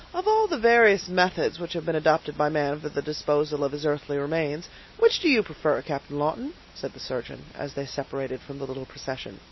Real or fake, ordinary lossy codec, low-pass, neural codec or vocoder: real; MP3, 24 kbps; 7.2 kHz; none